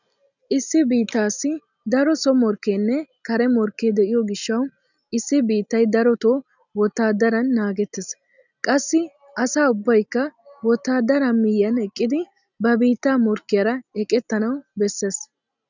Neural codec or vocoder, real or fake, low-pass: none; real; 7.2 kHz